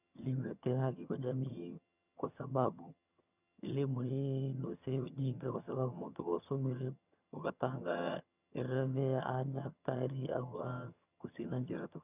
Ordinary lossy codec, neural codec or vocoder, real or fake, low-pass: none; vocoder, 22.05 kHz, 80 mel bands, HiFi-GAN; fake; 3.6 kHz